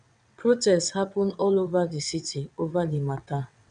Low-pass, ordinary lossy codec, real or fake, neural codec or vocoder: 9.9 kHz; none; fake; vocoder, 22.05 kHz, 80 mel bands, WaveNeXt